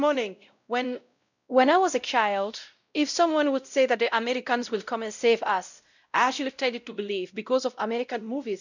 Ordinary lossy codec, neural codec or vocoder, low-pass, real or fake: none; codec, 16 kHz, 0.5 kbps, X-Codec, WavLM features, trained on Multilingual LibriSpeech; 7.2 kHz; fake